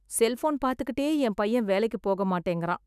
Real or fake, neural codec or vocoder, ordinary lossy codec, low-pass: fake; autoencoder, 48 kHz, 128 numbers a frame, DAC-VAE, trained on Japanese speech; none; 14.4 kHz